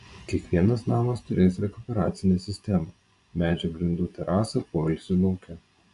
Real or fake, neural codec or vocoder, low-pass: fake; vocoder, 24 kHz, 100 mel bands, Vocos; 10.8 kHz